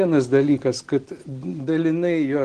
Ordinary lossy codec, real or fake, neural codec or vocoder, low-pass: Opus, 16 kbps; real; none; 9.9 kHz